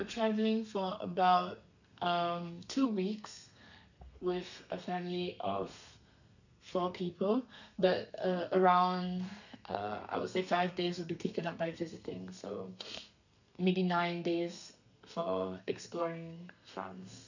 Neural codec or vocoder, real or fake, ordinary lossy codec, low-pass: codec, 32 kHz, 1.9 kbps, SNAC; fake; none; 7.2 kHz